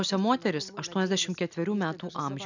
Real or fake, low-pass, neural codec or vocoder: real; 7.2 kHz; none